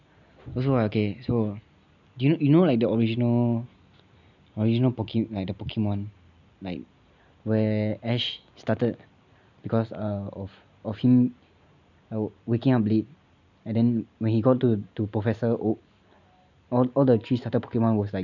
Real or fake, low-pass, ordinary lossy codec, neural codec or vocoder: real; 7.2 kHz; none; none